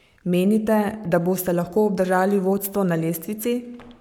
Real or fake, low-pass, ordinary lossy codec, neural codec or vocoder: fake; 19.8 kHz; none; codec, 44.1 kHz, 7.8 kbps, Pupu-Codec